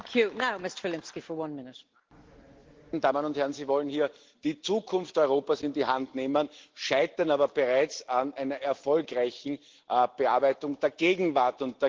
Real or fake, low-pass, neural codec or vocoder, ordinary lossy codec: real; 7.2 kHz; none; Opus, 16 kbps